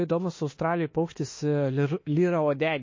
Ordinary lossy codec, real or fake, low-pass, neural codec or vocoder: MP3, 32 kbps; fake; 7.2 kHz; autoencoder, 48 kHz, 32 numbers a frame, DAC-VAE, trained on Japanese speech